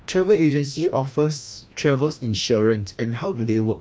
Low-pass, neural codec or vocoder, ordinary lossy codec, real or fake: none; codec, 16 kHz, 1 kbps, FreqCodec, larger model; none; fake